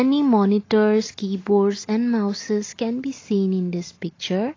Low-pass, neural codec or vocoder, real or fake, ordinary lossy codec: 7.2 kHz; none; real; AAC, 32 kbps